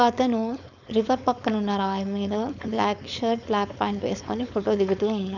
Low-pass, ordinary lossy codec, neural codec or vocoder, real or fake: 7.2 kHz; none; codec, 16 kHz, 4.8 kbps, FACodec; fake